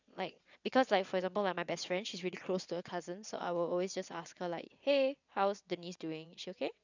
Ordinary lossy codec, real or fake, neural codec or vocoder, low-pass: none; fake; vocoder, 22.05 kHz, 80 mel bands, WaveNeXt; 7.2 kHz